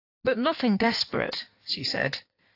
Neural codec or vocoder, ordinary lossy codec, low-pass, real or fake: codec, 16 kHz in and 24 kHz out, 1.1 kbps, FireRedTTS-2 codec; AAC, 32 kbps; 5.4 kHz; fake